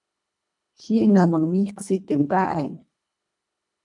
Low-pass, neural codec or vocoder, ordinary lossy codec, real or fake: 10.8 kHz; codec, 24 kHz, 1.5 kbps, HILCodec; MP3, 96 kbps; fake